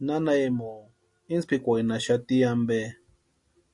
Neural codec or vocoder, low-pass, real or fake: none; 10.8 kHz; real